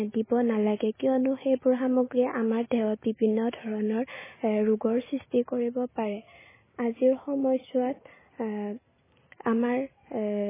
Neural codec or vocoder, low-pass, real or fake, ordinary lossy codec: none; 3.6 kHz; real; MP3, 16 kbps